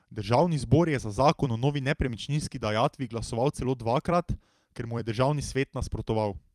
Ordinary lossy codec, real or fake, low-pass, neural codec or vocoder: Opus, 32 kbps; fake; 14.4 kHz; vocoder, 44.1 kHz, 128 mel bands every 256 samples, BigVGAN v2